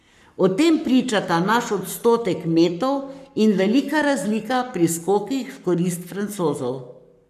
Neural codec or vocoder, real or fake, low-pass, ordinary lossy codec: codec, 44.1 kHz, 7.8 kbps, Pupu-Codec; fake; 14.4 kHz; none